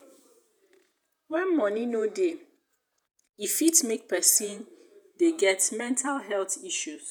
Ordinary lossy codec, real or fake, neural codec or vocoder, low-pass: none; fake; vocoder, 48 kHz, 128 mel bands, Vocos; none